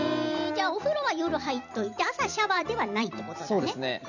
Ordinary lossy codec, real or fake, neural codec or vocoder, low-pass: none; fake; vocoder, 44.1 kHz, 128 mel bands every 256 samples, BigVGAN v2; 7.2 kHz